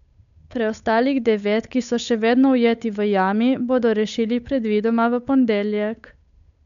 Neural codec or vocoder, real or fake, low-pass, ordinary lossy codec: codec, 16 kHz, 8 kbps, FunCodec, trained on Chinese and English, 25 frames a second; fake; 7.2 kHz; none